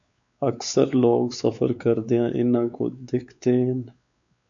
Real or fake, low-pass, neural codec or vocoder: fake; 7.2 kHz; codec, 16 kHz, 4 kbps, X-Codec, WavLM features, trained on Multilingual LibriSpeech